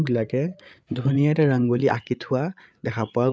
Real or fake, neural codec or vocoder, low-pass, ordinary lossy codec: fake; codec, 16 kHz, 8 kbps, FreqCodec, larger model; none; none